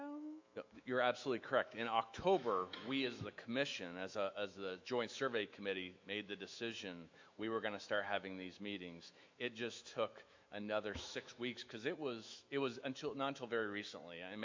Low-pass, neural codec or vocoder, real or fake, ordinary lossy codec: 7.2 kHz; autoencoder, 48 kHz, 128 numbers a frame, DAC-VAE, trained on Japanese speech; fake; MP3, 48 kbps